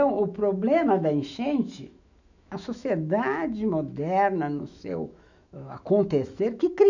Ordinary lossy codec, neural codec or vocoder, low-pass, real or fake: none; autoencoder, 48 kHz, 128 numbers a frame, DAC-VAE, trained on Japanese speech; 7.2 kHz; fake